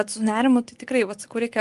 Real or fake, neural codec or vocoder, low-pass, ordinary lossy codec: real; none; 10.8 kHz; Opus, 32 kbps